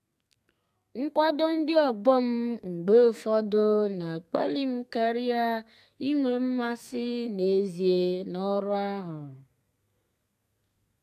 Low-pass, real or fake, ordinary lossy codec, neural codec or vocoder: 14.4 kHz; fake; AAC, 96 kbps; codec, 32 kHz, 1.9 kbps, SNAC